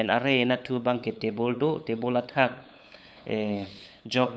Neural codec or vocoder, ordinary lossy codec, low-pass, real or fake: codec, 16 kHz, 8 kbps, FunCodec, trained on LibriTTS, 25 frames a second; none; none; fake